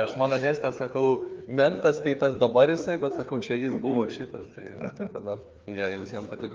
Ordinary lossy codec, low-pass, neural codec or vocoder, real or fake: Opus, 32 kbps; 7.2 kHz; codec, 16 kHz, 2 kbps, FreqCodec, larger model; fake